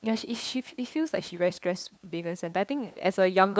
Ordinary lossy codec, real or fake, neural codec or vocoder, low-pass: none; fake; codec, 16 kHz, 2 kbps, FunCodec, trained on LibriTTS, 25 frames a second; none